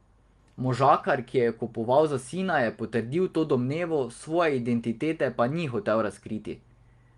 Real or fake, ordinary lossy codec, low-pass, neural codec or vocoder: real; Opus, 32 kbps; 10.8 kHz; none